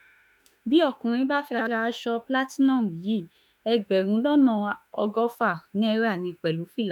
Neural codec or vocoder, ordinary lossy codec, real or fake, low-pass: autoencoder, 48 kHz, 32 numbers a frame, DAC-VAE, trained on Japanese speech; none; fake; 19.8 kHz